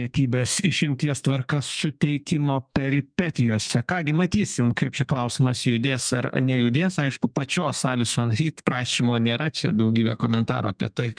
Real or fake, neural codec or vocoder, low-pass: fake; codec, 32 kHz, 1.9 kbps, SNAC; 9.9 kHz